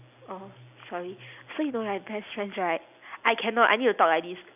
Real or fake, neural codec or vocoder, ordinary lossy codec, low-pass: real; none; none; 3.6 kHz